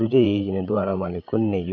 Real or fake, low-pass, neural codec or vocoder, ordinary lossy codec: fake; 7.2 kHz; codec, 16 kHz, 8 kbps, FreqCodec, larger model; none